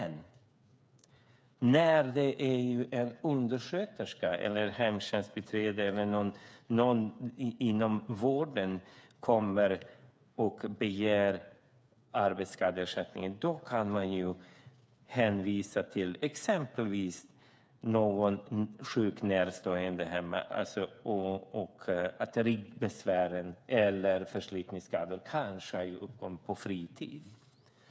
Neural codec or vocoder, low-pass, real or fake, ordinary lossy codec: codec, 16 kHz, 8 kbps, FreqCodec, smaller model; none; fake; none